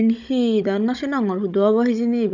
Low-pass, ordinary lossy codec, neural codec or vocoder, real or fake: 7.2 kHz; none; codec, 16 kHz, 16 kbps, FunCodec, trained on Chinese and English, 50 frames a second; fake